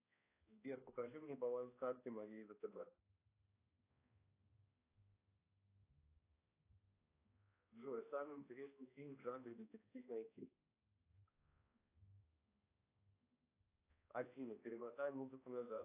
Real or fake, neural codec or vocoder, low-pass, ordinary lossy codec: fake; codec, 16 kHz, 1 kbps, X-Codec, HuBERT features, trained on balanced general audio; 3.6 kHz; AAC, 24 kbps